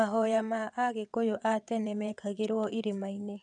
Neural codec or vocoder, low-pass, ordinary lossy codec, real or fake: vocoder, 22.05 kHz, 80 mel bands, WaveNeXt; 9.9 kHz; none; fake